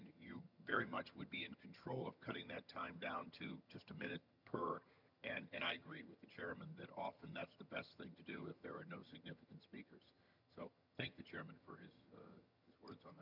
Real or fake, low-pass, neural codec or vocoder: fake; 5.4 kHz; vocoder, 22.05 kHz, 80 mel bands, HiFi-GAN